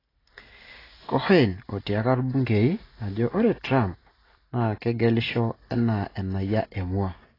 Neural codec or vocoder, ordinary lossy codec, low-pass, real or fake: none; AAC, 24 kbps; 5.4 kHz; real